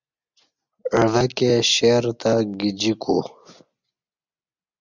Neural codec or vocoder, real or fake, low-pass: none; real; 7.2 kHz